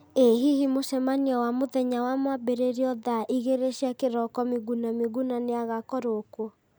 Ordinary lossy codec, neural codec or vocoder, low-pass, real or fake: none; none; none; real